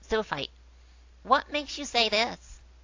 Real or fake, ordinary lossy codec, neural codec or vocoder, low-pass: real; AAC, 48 kbps; none; 7.2 kHz